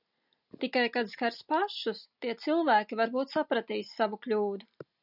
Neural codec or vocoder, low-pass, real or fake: none; 5.4 kHz; real